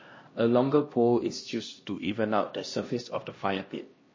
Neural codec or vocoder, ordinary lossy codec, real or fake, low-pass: codec, 16 kHz, 1 kbps, X-Codec, HuBERT features, trained on LibriSpeech; MP3, 32 kbps; fake; 7.2 kHz